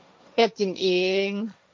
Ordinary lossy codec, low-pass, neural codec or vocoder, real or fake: none; none; codec, 16 kHz, 1.1 kbps, Voila-Tokenizer; fake